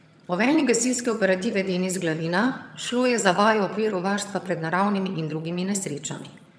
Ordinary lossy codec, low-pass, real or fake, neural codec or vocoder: none; none; fake; vocoder, 22.05 kHz, 80 mel bands, HiFi-GAN